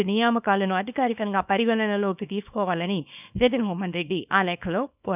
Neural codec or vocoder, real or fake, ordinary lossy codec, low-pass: codec, 24 kHz, 0.9 kbps, WavTokenizer, small release; fake; none; 3.6 kHz